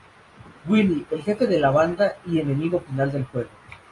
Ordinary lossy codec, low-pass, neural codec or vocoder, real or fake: AAC, 32 kbps; 10.8 kHz; vocoder, 44.1 kHz, 128 mel bands every 256 samples, BigVGAN v2; fake